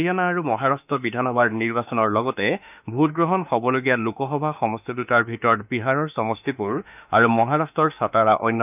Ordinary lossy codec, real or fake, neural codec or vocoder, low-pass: none; fake; autoencoder, 48 kHz, 32 numbers a frame, DAC-VAE, trained on Japanese speech; 3.6 kHz